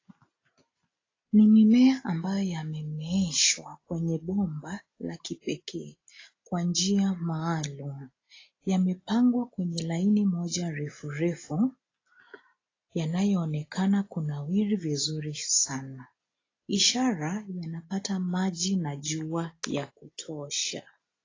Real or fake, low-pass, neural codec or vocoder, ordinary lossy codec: real; 7.2 kHz; none; AAC, 32 kbps